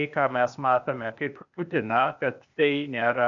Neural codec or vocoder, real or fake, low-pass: codec, 16 kHz, 0.8 kbps, ZipCodec; fake; 7.2 kHz